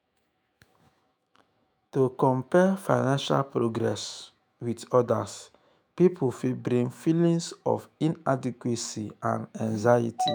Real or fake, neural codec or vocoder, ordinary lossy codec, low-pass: fake; autoencoder, 48 kHz, 128 numbers a frame, DAC-VAE, trained on Japanese speech; none; none